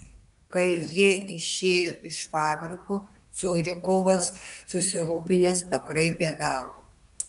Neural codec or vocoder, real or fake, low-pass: codec, 24 kHz, 1 kbps, SNAC; fake; 10.8 kHz